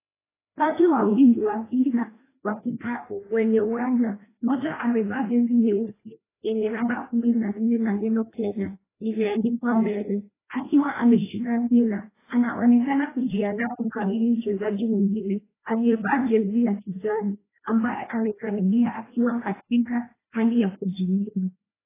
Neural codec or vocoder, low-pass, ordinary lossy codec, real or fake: codec, 16 kHz, 1 kbps, FreqCodec, larger model; 3.6 kHz; AAC, 16 kbps; fake